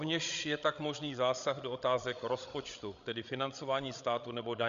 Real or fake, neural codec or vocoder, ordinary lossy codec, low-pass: fake; codec, 16 kHz, 16 kbps, FreqCodec, larger model; Opus, 64 kbps; 7.2 kHz